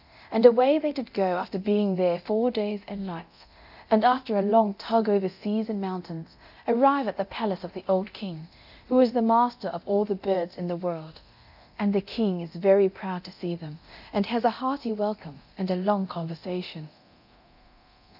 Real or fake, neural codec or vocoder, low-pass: fake; codec, 24 kHz, 0.9 kbps, DualCodec; 5.4 kHz